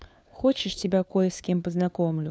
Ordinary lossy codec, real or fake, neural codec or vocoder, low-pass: none; fake; codec, 16 kHz, 4 kbps, FunCodec, trained on LibriTTS, 50 frames a second; none